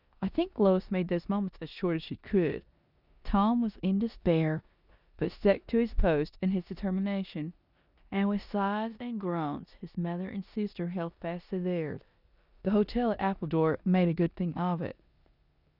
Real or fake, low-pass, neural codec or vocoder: fake; 5.4 kHz; codec, 16 kHz in and 24 kHz out, 0.9 kbps, LongCat-Audio-Codec, four codebook decoder